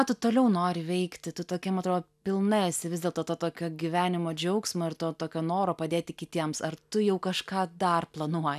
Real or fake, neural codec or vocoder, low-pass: real; none; 14.4 kHz